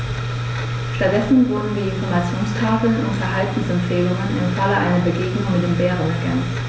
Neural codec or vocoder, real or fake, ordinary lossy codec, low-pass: none; real; none; none